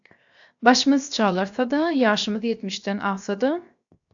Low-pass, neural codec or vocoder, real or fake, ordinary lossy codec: 7.2 kHz; codec, 16 kHz, 0.7 kbps, FocalCodec; fake; AAC, 64 kbps